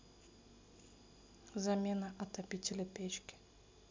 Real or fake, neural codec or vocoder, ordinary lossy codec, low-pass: real; none; MP3, 64 kbps; 7.2 kHz